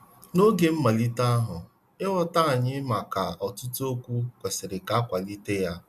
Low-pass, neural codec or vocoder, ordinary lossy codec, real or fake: 14.4 kHz; none; none; real